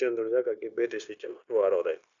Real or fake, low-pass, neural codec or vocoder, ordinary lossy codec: fake; 7.2 kHz; codec, 16 kHz, 0.9 kbps, LongCat-Audio-Codec; none